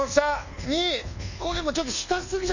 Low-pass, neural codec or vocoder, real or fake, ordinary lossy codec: 7.2 kHz; codec, 24 kHz, 1.2 kbps, DualCodec; fake; none